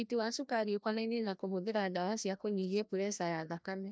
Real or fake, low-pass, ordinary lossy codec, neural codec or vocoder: fake; none; none; codec, 16 kHz, 1 kbps, FreqCodec, larger model